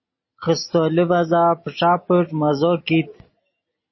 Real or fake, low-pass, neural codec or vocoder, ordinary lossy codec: real; 7.2 kHz; none; MP3, 24 kbps